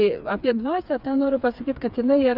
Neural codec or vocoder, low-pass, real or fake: codec, 16 kHz, 4 kbps, FreqCodec, smaller model; 5.4 kHz; fake